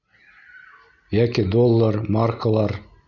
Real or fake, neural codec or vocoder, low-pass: real; none; 7.2 kHz